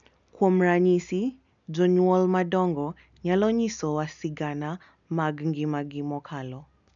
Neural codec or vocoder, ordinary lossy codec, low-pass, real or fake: none; none; 7.2 kHz; real